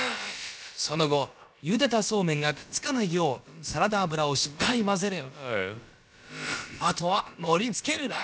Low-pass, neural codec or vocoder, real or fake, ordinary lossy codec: none; codec, 16 kHz, about 1 kbps, DyCAST, with the encoder's durations; fake; none